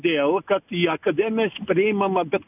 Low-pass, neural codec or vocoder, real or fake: 3.6 kHz; none; real